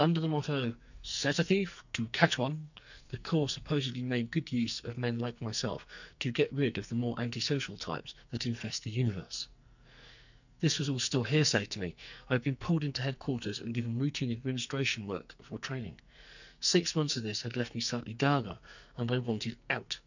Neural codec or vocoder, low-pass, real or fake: codec, 44.1 kHz, 2.6 kbps, SNAC; 7.2 kHz; fake